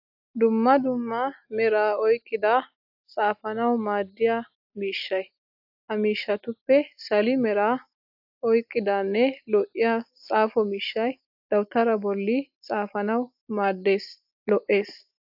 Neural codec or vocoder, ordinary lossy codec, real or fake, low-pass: none; AAC, 48 kbps; real; 5.4 kHz